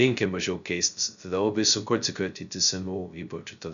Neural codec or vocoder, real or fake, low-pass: codec, 16 kHz, 0.2 kbps, FocalCodec; fake; 7.2 kHz